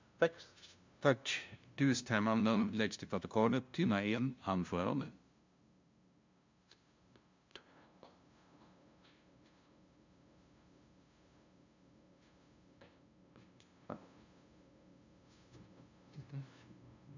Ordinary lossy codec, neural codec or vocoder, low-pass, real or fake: MP3, 64 kbps; codec, 16 kHz, 0.5 kbps, FunCodec, trained on LibriTTS, 25 frames a second; 7.2 kHz; fake